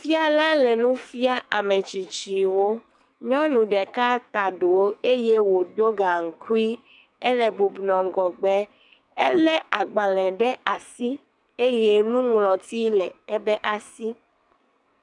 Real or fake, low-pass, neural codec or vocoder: fake; 10.8 kHz; codec, 44.1 kHz, 2.6 kbps, SNAC